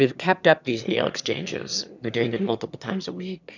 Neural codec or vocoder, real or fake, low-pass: autoencoder, 22.05 kHz, a latent of 192 numbers a frame, VITS, trained on one speaker; fake; 7.2 kHz